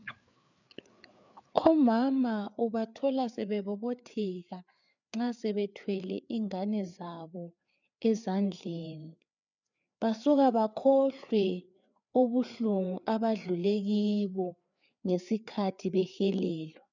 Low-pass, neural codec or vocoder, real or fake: 7.2 kHz; codec, 16 kHz, 4 kbps, FreqCodec, larger model; fake